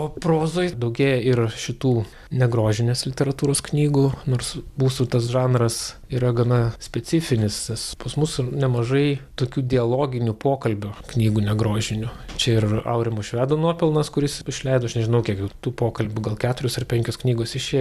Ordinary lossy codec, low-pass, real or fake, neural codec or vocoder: AAC, 96 kbps; 14.4 kHz; real; none